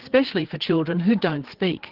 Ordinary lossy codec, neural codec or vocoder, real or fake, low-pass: Opus, 16 kbps; codec, 16 kHz, 4 kbps, FreqCodec, larger model; fake; 5.4 kHz